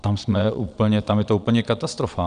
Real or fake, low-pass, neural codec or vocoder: fake; 9.9 kHz; vocoder, 22.05 kHz, 80 mel bands, Vocos